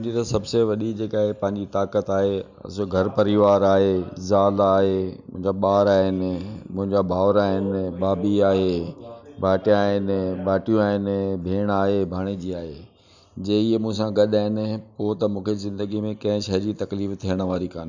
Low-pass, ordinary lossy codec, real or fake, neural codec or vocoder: 7.2 kHz; none; real; none